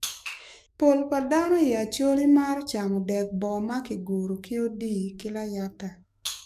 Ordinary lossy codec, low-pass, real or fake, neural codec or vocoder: MP3, 96 kbps; 14.4 kHz; fake; codec, 44.1 kHz, 7.8 kbps, DAC